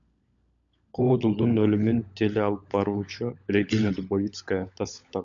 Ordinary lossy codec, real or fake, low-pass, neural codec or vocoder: MP3, 64 kbps; fake; 7.2 kHz; codec, 16 kHz, 16 kbps, FunCodec, trained on LibriTTS, 50 frames a second